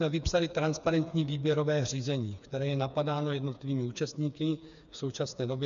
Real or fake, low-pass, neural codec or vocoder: fake; 7.2 kHz; codec, 16 kHz, 4 kbps, FreqCodec, smaller model